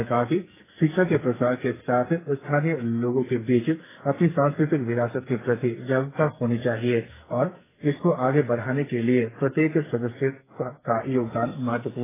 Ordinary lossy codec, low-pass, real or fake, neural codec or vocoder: AAC, 16 kbps; 3.6 kHz; fake; codec, 44.1 kHz, 2.6 kbps, SNAC